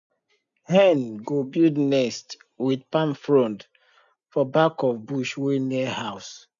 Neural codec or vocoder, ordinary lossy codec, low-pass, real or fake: none; none; 7.2 kHz; real